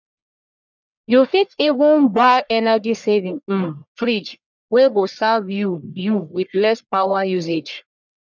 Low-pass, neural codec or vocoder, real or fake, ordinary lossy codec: 7.2 kHz; codec, 44.1 kHz, 1.7 kbps, Pupu-Codec; fake; none